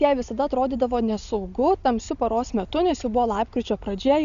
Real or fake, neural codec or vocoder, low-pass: real; none; 7.2 kHz